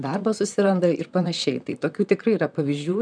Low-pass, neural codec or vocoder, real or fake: 9.9 kHz; vocoder, 44.1 kHz, 128 mel bands, Pupu-Vocoder; fake